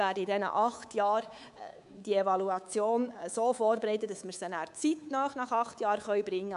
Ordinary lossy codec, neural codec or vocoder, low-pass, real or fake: none; codec, 24 kHz, 3.1 kbps, DualCodec; 10.8 kHz; fake